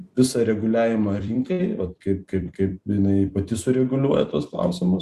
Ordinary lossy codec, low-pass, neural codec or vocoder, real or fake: Opus, 64 kbps; 14.4 kHz; vocoder, 44.1 kHz, 128 mel bands every 256 samples, BigVGAN v2; fake